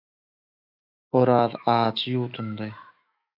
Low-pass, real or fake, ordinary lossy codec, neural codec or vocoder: 5.4 kHz; fake; AAC, 48 kbps; autoencoder, 48 kHz, 128 numbers a frame, DAC-VAE, trained on Japanese speech